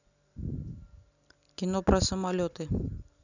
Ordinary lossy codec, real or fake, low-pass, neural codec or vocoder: none; real; 7.2 kHz; none